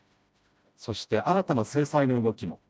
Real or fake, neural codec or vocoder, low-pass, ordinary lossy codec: fake; codec, 16 kHz, 1 kbps, FreqCodec, smaller model; none; none